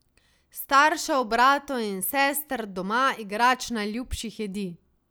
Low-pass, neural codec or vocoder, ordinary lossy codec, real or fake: none; none; none; real